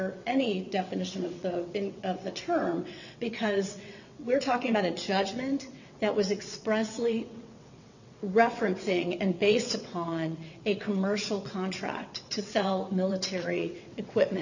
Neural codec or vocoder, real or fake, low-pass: vocoder, 22.05 kHz, 80 mel bands, WaveNeXt; fake; 7.2 kHz